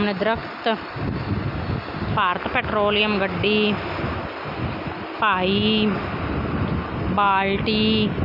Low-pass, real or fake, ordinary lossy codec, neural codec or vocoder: 5.4 kHz; real; none; none